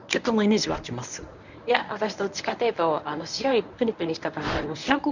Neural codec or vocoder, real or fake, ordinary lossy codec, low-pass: codec, 24 kHz, 0.9 kbps, WavTokenizer, medium speech release version 1; fake; none; 7.2 kHz